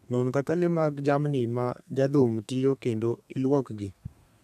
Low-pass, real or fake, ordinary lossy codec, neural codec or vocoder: 14.4 kHz; fake; none; codec, 32 kHz, 1.9 kbps, SNAC